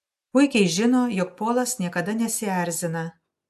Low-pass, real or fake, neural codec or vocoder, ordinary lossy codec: 14.4 kHz; real; none; AAC, 96 kbps